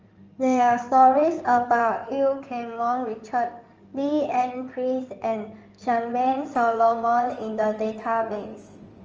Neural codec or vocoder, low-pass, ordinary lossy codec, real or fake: codec, 16 kHz in and 24 kHz out, 2.2 kbps, FireRedTTS-2 codec; 7.2 kHz; Opus, 24 kbps; fake